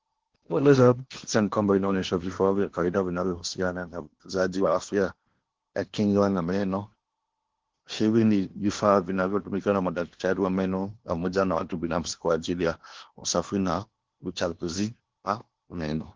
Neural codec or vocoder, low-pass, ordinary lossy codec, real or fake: codec, 16 kHz in and 24 kHz out, 0.8 kbps, FocalCodec, streaming, 65536 codes; 7.2 kHz; Opus, 16 kbps; fake